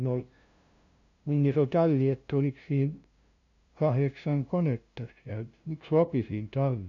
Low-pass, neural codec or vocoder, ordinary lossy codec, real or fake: 7.2 kHz; codec, 16 kHz, 0.5 kbps, FunCodec, trained on LibriTTS, 25 frames a second; none; fake